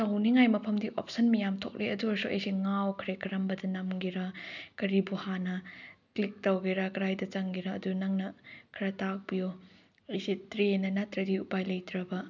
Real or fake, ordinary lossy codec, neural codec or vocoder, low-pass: real; none; none; 7.2 kHz